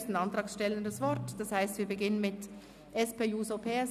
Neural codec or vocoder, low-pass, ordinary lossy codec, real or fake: none; 14.4 kHz; none; real